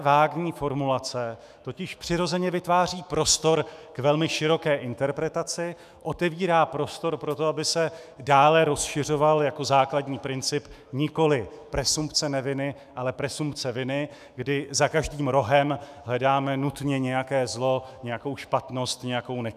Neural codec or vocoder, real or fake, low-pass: autoencoder, 48 kHz, 128 numbers a frame, DAC-VAE, trained on Japanese speech; fake; 14.4 kHz